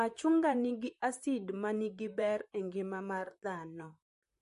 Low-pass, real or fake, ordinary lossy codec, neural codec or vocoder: 14.4 kHz; fake; MP3, 48 kbps; vocoder, 44.1 kHz, 128 mel bands, Pupu-Vocoder